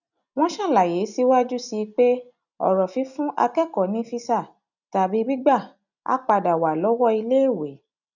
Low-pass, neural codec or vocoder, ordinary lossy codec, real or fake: 7.2 kHz; none; none; real